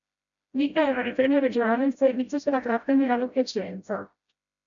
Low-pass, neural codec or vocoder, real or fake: 7.2 kHz; codec, 16 kHz, 0.5 kbps, FreqCodec, smaller model; fake